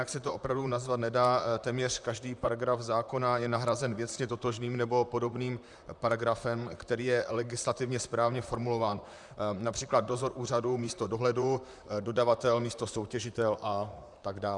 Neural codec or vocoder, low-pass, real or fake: vocoder, 44.1 kHz, 128 mel bands, Pupu-Vocoder; 10.8 kHz; fake